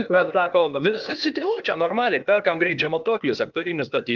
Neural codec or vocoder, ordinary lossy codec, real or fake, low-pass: codec, 16 kHz, 0.8 kbps, ZipCodec; Opus, 24 kbps; fake; 7.2 kHz